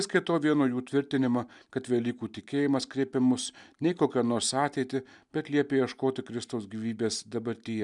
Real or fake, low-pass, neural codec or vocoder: real; 10.8 kHz; none